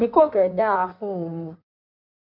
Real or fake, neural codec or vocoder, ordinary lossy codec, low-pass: fake; codec, 16 kHz in and 24 kHz out, 1.1 kbps, FireRedTTS-2 codec; none; 5.4 kHz